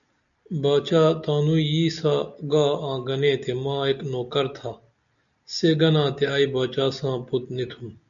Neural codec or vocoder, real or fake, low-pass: none; real; 7.2 kHz